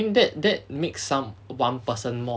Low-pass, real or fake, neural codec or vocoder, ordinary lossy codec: none; real; none; none